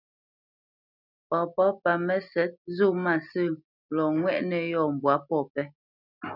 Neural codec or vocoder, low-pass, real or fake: none; 5.4 kHz; real